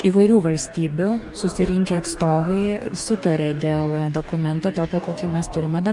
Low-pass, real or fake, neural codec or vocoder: 10.8 kHz; fake; codec, 44.1 kHz, 2.6 kbps, DAC